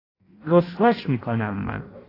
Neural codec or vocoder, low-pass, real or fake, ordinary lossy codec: codec, 16 kHz in and 24 kHz out, 0.6 kbps, FireRedTTS-2 codec; 5.4 kHz; fake; AAC, 24 kbps